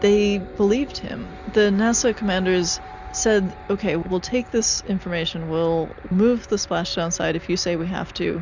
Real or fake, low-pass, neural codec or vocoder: real; 7.2 kHz; none